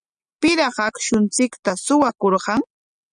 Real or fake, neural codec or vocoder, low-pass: real; none; 9.9 kHz